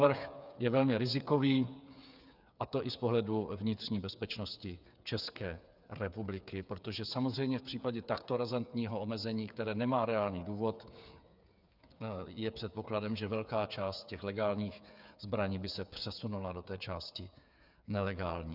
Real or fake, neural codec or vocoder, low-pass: fake; codec, 16 kHz, 8 kbps, FreqCodec, smaller model; 5.4 kHz